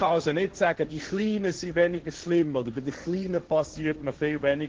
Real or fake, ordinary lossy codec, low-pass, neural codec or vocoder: fake; Opus, 16 kbps; 7.2 kHz; codec, 16 kHz, 1.1 kbps, Voila-Tokenizer